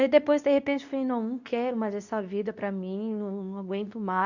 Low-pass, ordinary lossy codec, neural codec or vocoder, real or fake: 7.2 kHz; none; codec, 24 kHz, 0.9 kbps, WavTokenizer, medium speech release version 2; fake